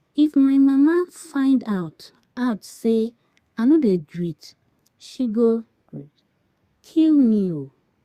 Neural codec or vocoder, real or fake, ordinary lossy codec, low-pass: codec, 32 kHz, 1.9 kbps, SNAC; fake; Opus, 64 kbps; 14.4 kHz